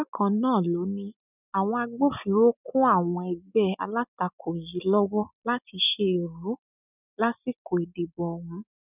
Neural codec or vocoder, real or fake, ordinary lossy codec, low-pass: none; real; none; 3.6 kHz